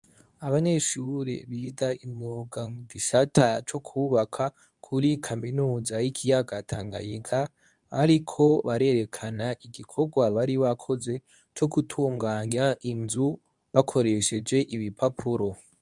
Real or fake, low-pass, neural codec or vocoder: fake; 10.8 kHz; codec, 24 kHz, 0.9 kbps, WavTokenizer, medium speech release version 2